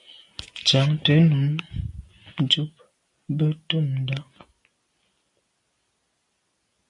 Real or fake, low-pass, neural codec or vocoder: real; 10.8 kHz; none